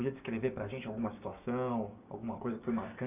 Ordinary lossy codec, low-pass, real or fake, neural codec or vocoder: none; 3.6 kHz; fake; codec, 16 kHz, 6 kbps, DAC